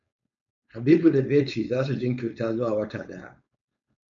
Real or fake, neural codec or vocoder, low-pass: fake; codec, 16 kHz, 4.8 kbps, FACodec; 7.2 kHz